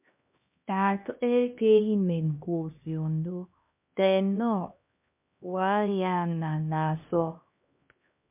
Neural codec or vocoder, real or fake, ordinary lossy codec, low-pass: codec, 16 kHz, 1 kbps, X-Codec, HuBERT features, trained on LibriSpeech; fake; MP3, 32 kbps; 3.6 kHz